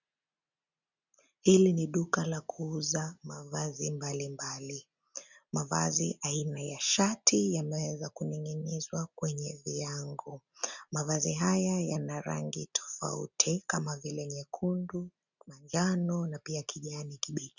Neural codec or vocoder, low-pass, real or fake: none; 7.2 kHz; real